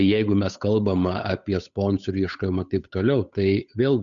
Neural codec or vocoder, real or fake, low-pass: codec, 16 kHz, 8 kbps, FunCodec, trained on Chinese and English, 25 frames a second; fake; 7.2 kHz